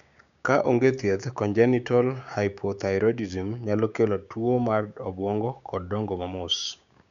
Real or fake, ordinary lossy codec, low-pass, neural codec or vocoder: fake; none; 7.2 kHz; codec, 16 kHz, 6 kbps, DAC